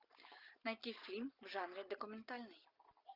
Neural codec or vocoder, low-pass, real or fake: none; 5.4 kHz; real